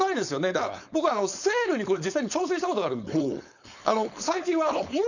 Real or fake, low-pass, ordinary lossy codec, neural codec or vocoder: fake; 7.2 kHz; none; codec, 16 kHz, 4.8 kbps, FACodec